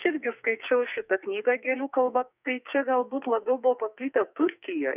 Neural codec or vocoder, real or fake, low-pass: codec, 44.1 kHz, 2.6 kbps, SNAC; fake; 3.6 kHz